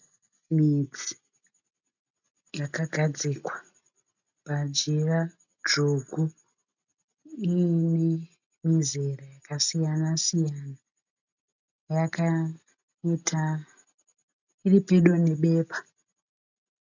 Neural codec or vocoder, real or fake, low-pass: none; real; 7.2 kHz